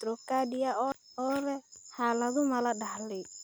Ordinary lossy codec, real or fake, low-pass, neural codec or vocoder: none; real; none; none